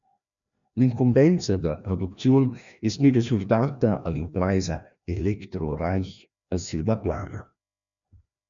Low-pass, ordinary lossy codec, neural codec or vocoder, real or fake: 7.2 kHz; MP3, 96 kbps; codec, 16 kHz, 1 kbps, FreqCodec, larger model; fake